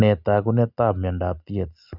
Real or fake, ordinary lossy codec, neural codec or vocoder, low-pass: real; none; none; 5.4 kHz